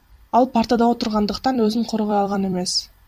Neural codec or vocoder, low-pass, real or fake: vocoder, 44.1 kHz, 128 mel bands every 256 samples, BigVGAN v2; 14.4 kHz; fake